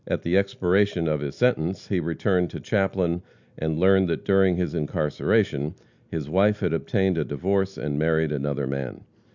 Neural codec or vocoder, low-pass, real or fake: none; 7.2 kHz; real